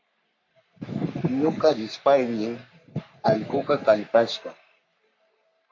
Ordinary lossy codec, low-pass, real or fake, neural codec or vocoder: MP3, 64 kbps; 7.2 kHz; fake; codec, 44.1 kHz, 3.4 kbps, Pupu-Codec